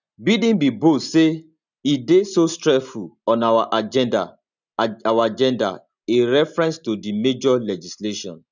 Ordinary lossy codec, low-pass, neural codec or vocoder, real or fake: none; 7.2 kHz; none; real